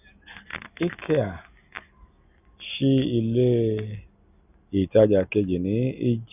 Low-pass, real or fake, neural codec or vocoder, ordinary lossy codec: 3.6 kHz; real; none; none